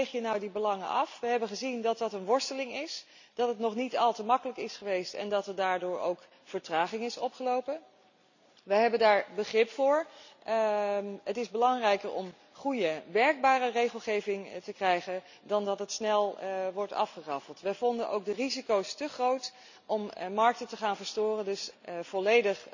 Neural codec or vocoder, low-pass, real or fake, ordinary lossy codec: none; 7.2 kHz; real; none